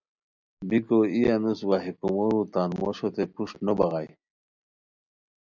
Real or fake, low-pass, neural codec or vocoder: real; 7.2 kHz; none